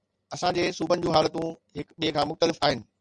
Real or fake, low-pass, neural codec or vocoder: real; 9.9 kHz; none